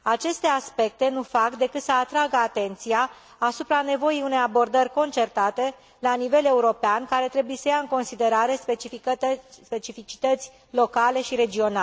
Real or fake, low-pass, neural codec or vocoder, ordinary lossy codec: real; none; none; none